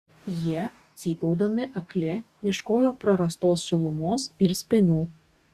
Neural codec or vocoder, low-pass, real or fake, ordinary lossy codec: codec, 44.1 kHz, 2.6 kbps, DAC; 14.4 kHz; fake; Opus, 64 kbps